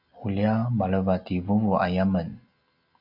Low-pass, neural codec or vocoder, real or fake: 5.4 kHz; none; real